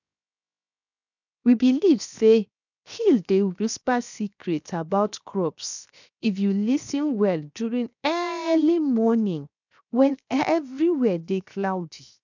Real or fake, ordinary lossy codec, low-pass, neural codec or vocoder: fake; none; 7.2 kHz; codec, 16 kHz, 0.7 kbps, FocalCodec